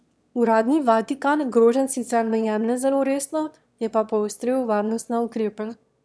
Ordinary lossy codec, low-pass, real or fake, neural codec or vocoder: none; none; fake; autoencoder, 22.05 kHz, a latent of 192 numbers a frame, VITS, trained on one speaker